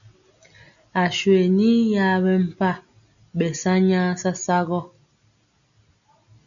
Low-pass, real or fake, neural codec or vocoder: 7.2 kHz; real; none